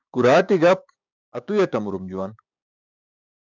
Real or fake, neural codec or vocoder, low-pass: fake; codec, 16 kHz, 6 kbps, DAC; 7.2 kHz